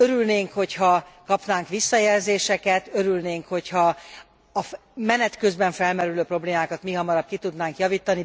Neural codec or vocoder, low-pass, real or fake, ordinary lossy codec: none; none; real; none